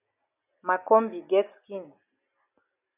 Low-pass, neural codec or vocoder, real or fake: 3.6 kHz; none; real